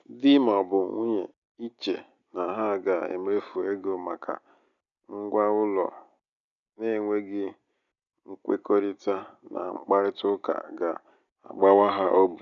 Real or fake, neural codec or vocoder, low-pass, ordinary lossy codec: real; none; 7.2 kHz; none